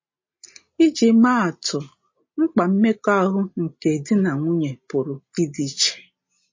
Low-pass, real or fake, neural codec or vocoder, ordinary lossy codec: 7.2 kHz; real; none; MP3, 32 kbps